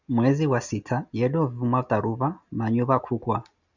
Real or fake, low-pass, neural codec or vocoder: real; 7.2 kHz; none